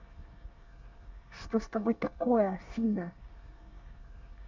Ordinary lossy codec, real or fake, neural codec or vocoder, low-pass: MP3, 64 kbps; fake; codec, 24 kHz, 1 kbps, SNAC; 7.2 kHz